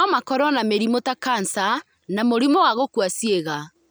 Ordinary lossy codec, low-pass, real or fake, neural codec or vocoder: none; none; real; none